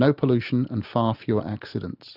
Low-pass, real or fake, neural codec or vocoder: 5.4 kHz; real; none